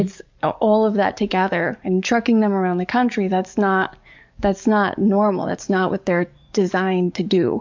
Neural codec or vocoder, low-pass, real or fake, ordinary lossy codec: codec, 44.1 kHz, 7.8 kbps, DAC; 7.2 kHz; fake; MP3, 64 kbps